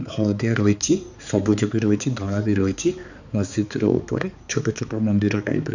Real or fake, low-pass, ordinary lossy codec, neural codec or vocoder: fake; 7.2 kHz; none; codec, 16 kHz, 4 kbps, X-Codec, HuBERT features, trained on balanced general audio